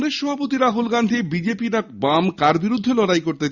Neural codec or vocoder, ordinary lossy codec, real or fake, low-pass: none; Opus, 64 kbps; real; 7.2 kHz